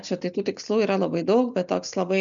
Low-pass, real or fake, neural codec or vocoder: 7.2 kHz; real; none